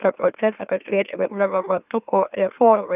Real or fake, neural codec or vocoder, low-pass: fake; autoencoder, 44.1 kHz, a latent of 192 numbers a frame, MeloTTS; 3.6 kHz